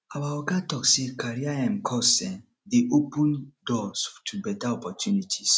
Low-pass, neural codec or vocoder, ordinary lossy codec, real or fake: none; none; none; real